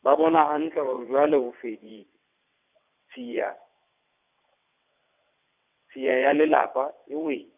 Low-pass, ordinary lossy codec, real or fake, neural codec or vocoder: 3.6 kHz; none; fake; vocoder, 22.05 kHz, 80 mel bands, WaveNeXt